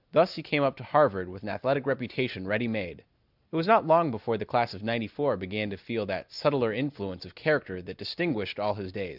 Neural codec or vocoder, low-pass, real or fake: none; 5.4 kHz; real